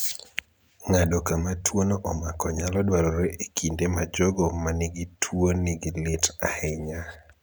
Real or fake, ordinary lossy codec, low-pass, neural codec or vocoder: real; none; none; none